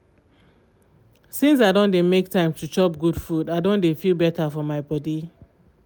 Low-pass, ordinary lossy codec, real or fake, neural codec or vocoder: none; none; real; none